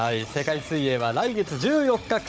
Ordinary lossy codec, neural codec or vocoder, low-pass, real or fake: none; codec, 16 kHz, 16 kbps, FunCodec, trained on Chinese and English, 50 frames a second; none; fake